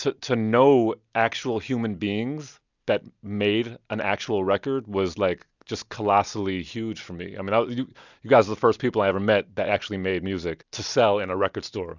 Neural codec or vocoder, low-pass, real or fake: none; 7.2 kHz; real